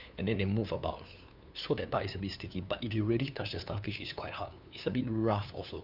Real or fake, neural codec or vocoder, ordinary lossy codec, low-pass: fake; codec, 16 kHz, 2 kbps, FunCodec, trained on LibriTTS, 25 frames a second; none; 5.4 kHz